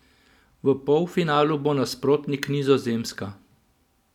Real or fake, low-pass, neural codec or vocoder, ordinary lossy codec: real; 19.8 kHz; none; none